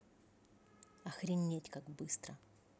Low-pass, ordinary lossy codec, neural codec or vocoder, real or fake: none; none; none; real